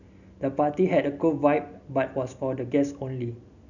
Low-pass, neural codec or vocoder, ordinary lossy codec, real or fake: 7.2 kHz; none; none; real